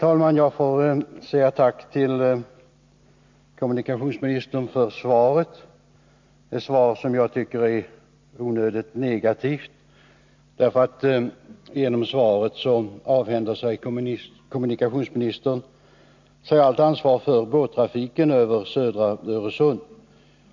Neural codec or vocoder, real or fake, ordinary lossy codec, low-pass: none; real; MP3, 64 kbps; 7.2 kHz